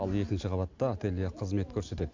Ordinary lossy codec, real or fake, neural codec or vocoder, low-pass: MP3, 64 kbps; real; none; 7.2 kHz